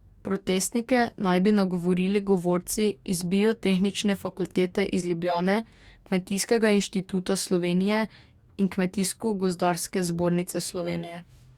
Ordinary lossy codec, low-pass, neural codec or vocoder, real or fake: Opus, 64 kbps; 19.8 kHz; codec, 44.1 kHz, 2.6 kbps, DAC; fake